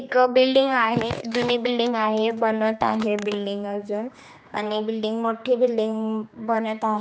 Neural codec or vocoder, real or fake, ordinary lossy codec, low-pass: codec, 16 kHz, 2 kbps, X-Codec, HuBERT features, trained on general audio; fake; none; none